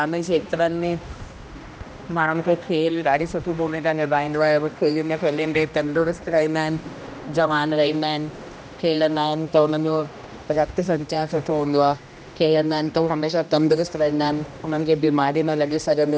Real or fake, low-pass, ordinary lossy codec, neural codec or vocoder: fake; none; none; codec, 16 kHz, 1 kbps, X-Codec, HuBERT features, trained on general audio